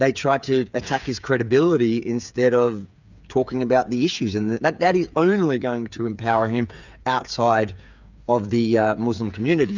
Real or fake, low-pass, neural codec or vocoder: fake; 7.2 kHz; codec, 16 kHz, 8 kbps, FreqCodec, smaller model